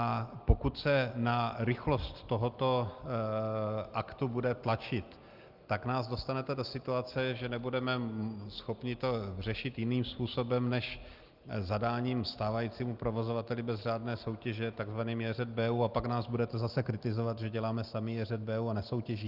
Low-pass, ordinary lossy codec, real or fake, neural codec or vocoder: 5.4 kHz; Opus, 24 kbps; real; none